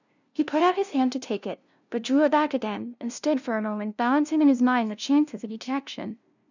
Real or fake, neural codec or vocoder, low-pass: fake; codec, 16 kHz, 0.5 kbps, FunCodec, trained on LibriTTS, 25 frames a second; 7.2 kHz